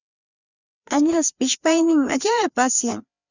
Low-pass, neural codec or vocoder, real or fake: 7.2 kHz; codec, 16 kHz, 2 kbps, FreqCodec, larger model; fake